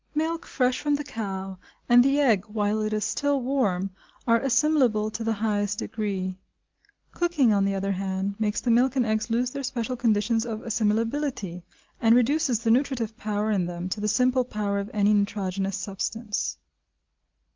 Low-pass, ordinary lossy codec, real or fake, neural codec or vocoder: 7.2 kHz; Opus, 24 kbps; real; none